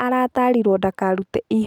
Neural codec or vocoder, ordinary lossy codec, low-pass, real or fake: none; none; 19.8 kHz; real